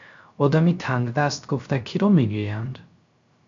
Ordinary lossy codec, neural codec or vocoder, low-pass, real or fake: MP3, 64 kbps; codec, 16 kHz, 0.3 kbps, FocalCodec; 7.2 kHz; fake